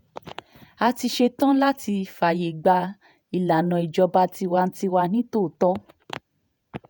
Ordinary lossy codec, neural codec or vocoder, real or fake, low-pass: none; vocoder, 48 kHz, 128 mel bands, Vocos; fake; none